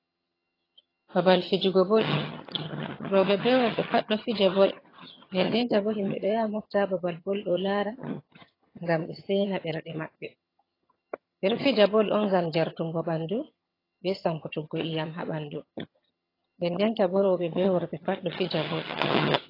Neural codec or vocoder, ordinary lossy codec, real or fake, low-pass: vocoder, 22.05 kHz, 80 mel bands, HiFi-GAN; AAC, 24 kbps; fake; 5.4 kHz